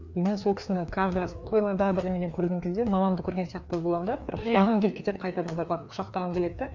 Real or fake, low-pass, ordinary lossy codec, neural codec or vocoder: fake; 7.2 kHz; none; codec, 16 kHz, 2 kbps, FreqCodec, larger model